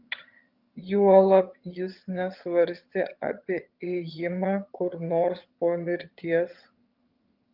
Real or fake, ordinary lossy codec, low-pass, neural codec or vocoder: fake; Opus, 32 kbps; 5.4 kHz; vocoder, 22.05 kHz, 80 mel bands, HiFi-GAN